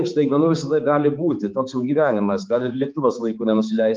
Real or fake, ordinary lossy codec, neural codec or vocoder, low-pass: fake; Opus, 32 kbps; codec, 16 kHz, 4 kbps, X-Codec, HuBERT features, trained on balanced general audio; 7.2 kHz